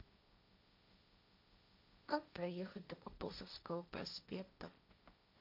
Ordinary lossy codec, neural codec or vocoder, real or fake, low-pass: MP3, 48 kbps; codec, 16 kHz, 1.1 kbps, Voila-Tokenizer; fake; 5.4 kHz